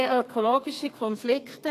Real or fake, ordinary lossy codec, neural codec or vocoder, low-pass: fake; AAC, 48 kbps; codec, 32 kHz, 1.9 kbps, SNAC; 14.4 kHz